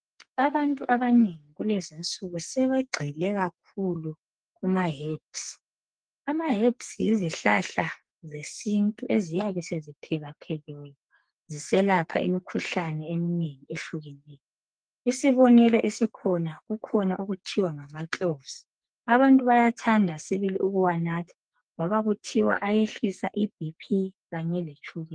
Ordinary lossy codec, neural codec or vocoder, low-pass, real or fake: Opus, 24 kbps; codec, 44.1 kHz, 2.6 kbps, SNAC; 9.9 kHz; fake